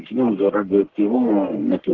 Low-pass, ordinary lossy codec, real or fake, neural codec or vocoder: 7.2 kHz; Opus, 16 kbps; fake; codec, 44.1 kHz, 1.7 kbps, Pupu-Codec